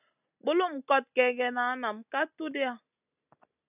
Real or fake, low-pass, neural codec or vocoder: real; 3.6 kHz; none